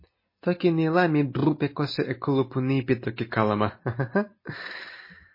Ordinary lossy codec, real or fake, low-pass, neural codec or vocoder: MP3, 24 kbps; real; 5.4 kHz; none